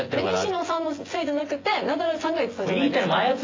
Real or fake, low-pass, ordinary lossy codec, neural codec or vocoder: fake; 7.2 kHz; AAC, 32 kbps; vocoder, 24 kHz, 100 mel bands, Vocos